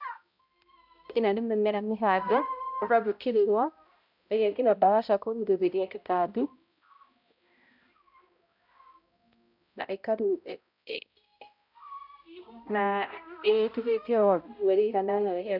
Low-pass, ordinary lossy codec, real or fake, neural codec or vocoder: 5.4 kHz; none; fake; codec, 16 kHz, 0.5 kbps, X-Codec, HuBERT features, trained on balanced general audio